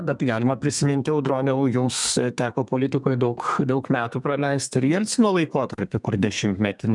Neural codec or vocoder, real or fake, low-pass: codec, 32 kHz, 1.9 kbps, SNAC; fake; 10.8 kHz